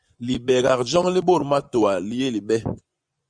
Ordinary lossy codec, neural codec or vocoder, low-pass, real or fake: Opus, 64 kbps; vocoder, 24 kHz, 100 mel bands, Vocos; 9.9 kHz; fake